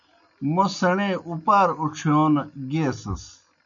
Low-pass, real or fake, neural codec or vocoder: 7.2 kHz; real; none